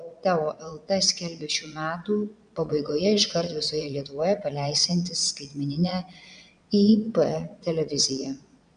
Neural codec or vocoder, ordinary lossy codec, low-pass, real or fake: vocoder, 22.05 kHz, 80 mel bands, Vocos; AAC, 96 kbps; 9.9 kHz; fake